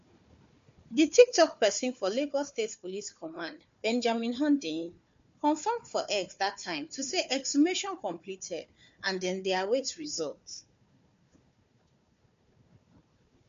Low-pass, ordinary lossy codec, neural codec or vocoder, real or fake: 7.2 kHz; MP3, 48 kbps; codec, 16 kHz, 4 kbps, FunCodec, trained on Chinese and English, 50 frames a second; fake